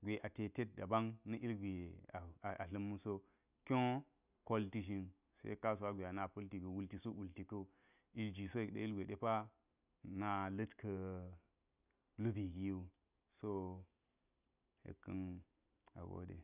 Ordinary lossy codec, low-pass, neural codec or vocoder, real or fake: none; 3.6 kHz; none; real